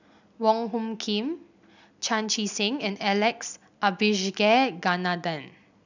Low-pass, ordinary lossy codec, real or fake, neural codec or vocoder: 7.2 kHz; none; real; none